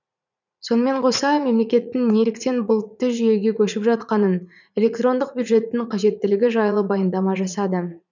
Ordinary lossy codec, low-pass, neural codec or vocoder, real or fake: none; 7.2 kHz; vocoder, 44.1 kHz, 80 mel bands, Vocos; fake